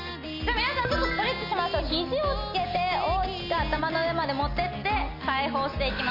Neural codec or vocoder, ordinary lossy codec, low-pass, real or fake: none; MP3, 32 kbps; 5.4 kHz; real